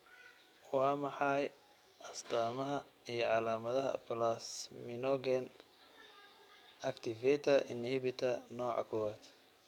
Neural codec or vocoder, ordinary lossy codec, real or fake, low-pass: codec, 44.1 kHz, 7.8 kbps, DAC; none; fake; 19.8 kHz